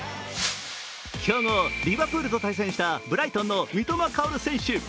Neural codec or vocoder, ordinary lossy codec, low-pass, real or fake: none; none; none; real